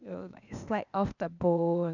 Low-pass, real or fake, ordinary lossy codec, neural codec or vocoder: 7.2 kHz; fake; none; codec, 16 kHz, 0.8 kbps, ZipCodec